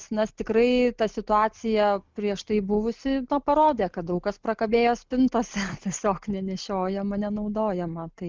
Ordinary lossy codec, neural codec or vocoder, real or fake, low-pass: Opus, 32 kbps; none; real; 7.2 kHz